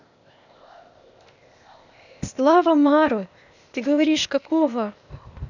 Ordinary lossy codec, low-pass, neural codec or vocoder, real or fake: none; 7.2 kHz; codec, 16 kHz, 0.8 kbps, ZipCodec; fake